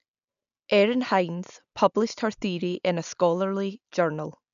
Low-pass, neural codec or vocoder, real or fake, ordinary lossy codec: 7.2 kHz; none; real; none